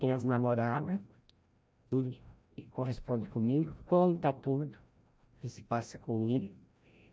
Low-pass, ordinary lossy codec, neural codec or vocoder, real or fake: none; none; codec, 16 kHz, 0.5 kbps, FreqCodec, larger model; fake